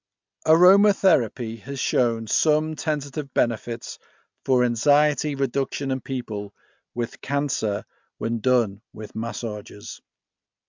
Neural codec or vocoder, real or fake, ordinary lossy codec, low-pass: none; real; MP3, 64 kbps; 7.2 kHz